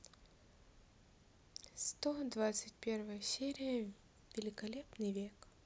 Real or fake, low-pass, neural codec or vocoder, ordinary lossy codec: real; none; none; none